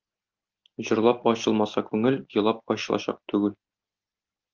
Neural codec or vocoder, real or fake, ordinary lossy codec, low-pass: none; real; Opus, 32 kbps; 7.2 kHz